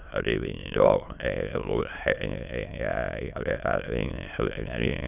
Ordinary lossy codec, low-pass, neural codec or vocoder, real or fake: none; 3.6 kHz; autoencoder, 22.05 kHz, a latent of 192 numbers a frame, VITS, trained on many speakers; fake